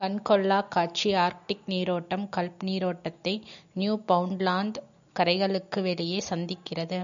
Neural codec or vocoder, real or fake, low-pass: none; real; 7.2 kHz